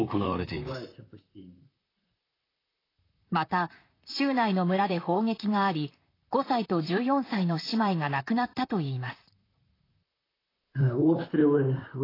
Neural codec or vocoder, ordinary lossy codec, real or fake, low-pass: vocoder, 44.1 kHz, 128 mel bands, Pupu-Vocoder; AAC, 24 kbps; fake; 5.4 kHz